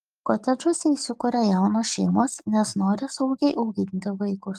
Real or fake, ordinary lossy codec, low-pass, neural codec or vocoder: fake; Opus, 32 kbps; 14.4 kHz; codec, 44.1 kHz, 7.8 kbps, DAC